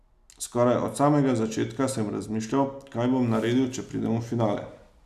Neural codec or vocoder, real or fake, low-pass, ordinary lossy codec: none; real; 14.4 kHz; none